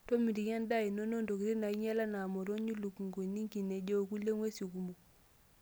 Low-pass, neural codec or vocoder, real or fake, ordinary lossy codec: none; none; real; none